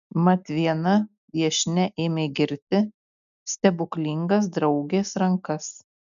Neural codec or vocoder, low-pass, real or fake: none; 7.2 kHz; real